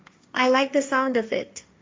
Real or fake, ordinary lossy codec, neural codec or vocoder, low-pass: fake; none; codec, 16 kHz, 1.1 kbps, Voila-Tokenizer; none